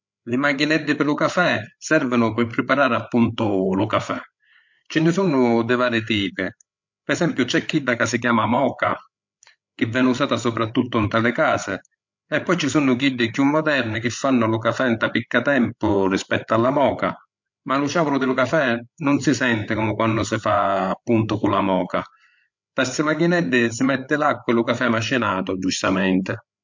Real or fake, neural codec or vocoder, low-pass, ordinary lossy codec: fake; codec, 16 kHz, 8 kbps, FreqCodec, larger model; 7.2 kHz; MP3, 48 kbps